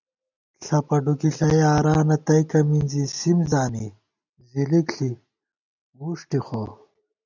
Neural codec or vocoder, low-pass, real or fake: none; 7.2 kHz; real